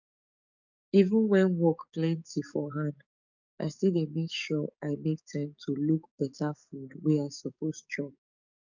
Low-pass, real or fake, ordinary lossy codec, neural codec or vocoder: 7.2 kHz; fake; none; codec, 44.1 kHz, 7.8 kbps, DAC